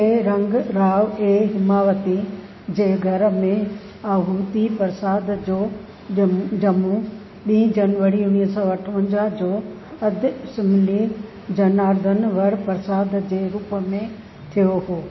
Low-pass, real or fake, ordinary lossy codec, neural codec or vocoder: 7.2 kHz; real; MP3, 24 kbps; none